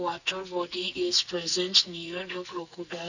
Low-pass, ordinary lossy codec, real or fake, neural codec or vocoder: 7.2 kHz; none; fake; codec, 44.1 kHz, 2.6 kbps, SNAC